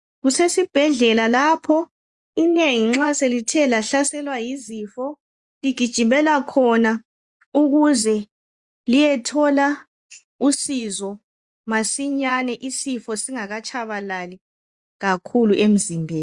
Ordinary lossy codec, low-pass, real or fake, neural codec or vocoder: Opus, 64 kbps; 10.8 kHz; fake; vocoder, 24 kHz, 100 mel bands, Vocos